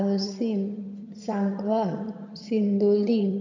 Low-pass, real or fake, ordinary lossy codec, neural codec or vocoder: 7.2 kHz; fake; AAC, 48 kbps; vocoder, 22.05 kHz, 80 mel bands, HiFi-GAN